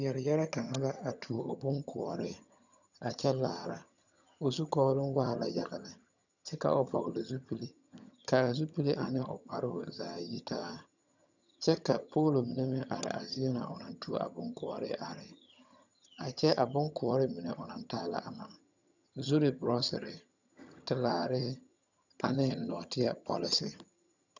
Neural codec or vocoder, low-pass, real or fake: vocoder, 22.05 kHz, 80 mel bands, HiFi-GAN; 7.2 kHz; fake